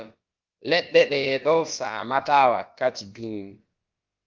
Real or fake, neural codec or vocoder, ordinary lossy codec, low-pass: fake; codec, 16 kHz, about 1 kbps, DyCAST, with the encoder's durations; Opus, 24 kbps; 7.2 kHz